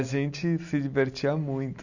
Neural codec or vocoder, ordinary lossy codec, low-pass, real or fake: none; none; 7.2 kHz; real